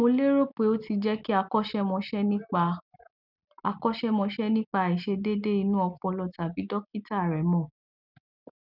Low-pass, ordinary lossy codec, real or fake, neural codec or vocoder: 5.4 kHz; none; real; none